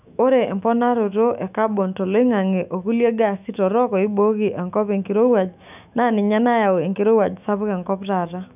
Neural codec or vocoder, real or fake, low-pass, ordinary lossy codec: autoencoder, 48 kHz, 128 numbers a frame, DAC-VAE, trained on Japanese speech; fake; 3.6 kHz; none